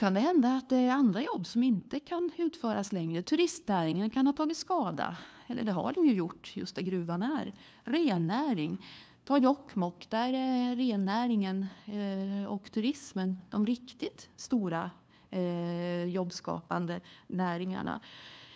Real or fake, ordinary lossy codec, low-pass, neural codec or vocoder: fake; none; none; codec, 16 kHz, 2 kbps, FunCodec, trained on LibriTTS, 25 frames a second